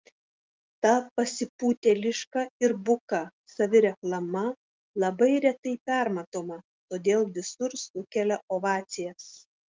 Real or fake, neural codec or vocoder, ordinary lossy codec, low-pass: real; none; Opus, 32 kbps; 7.2 kHz